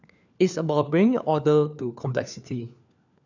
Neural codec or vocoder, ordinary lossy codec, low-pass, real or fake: codec, 16 kHz, 4 kbps, FunCodec, trained on LibriTTS, 50 frames a second; none; 7.2 kHz; fake